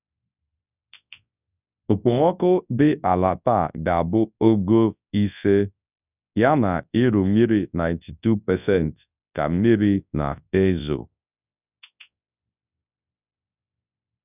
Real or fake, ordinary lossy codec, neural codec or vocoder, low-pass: fake; none; codec, 24 kHz, 0.9 kbps, WavTokenizer, large speech release; 3.6 kHz